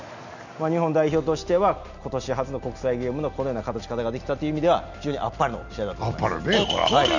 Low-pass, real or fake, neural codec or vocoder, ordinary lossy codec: 7.2 kHz; real; none; none